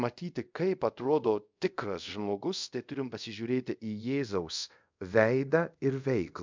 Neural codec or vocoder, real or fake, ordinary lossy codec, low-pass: codec, 24 kHz, 0.5 kbps, DualCodec; fake; MP3, 64 kbps; 7.2 kHz